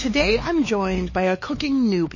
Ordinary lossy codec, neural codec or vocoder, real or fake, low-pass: MP3, 32 kbps; codec, 16 kHz, 4 kbps, X-Codec, HuBERT features, trained on LibriSpeech; fake; 7.2 kHz